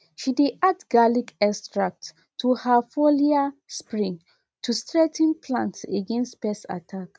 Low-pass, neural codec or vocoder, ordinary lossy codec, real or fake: none; none; none; real